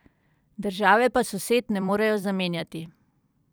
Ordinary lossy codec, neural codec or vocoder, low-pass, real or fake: none; vocoder, 44.1 kHz, 128 mel bands every 256 samples, BigVGAN v2; none; fake